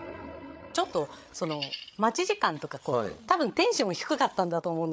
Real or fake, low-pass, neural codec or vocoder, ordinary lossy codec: fake; none; codec, 16 kHz, 8 kbps, FreqCodec, larger model; none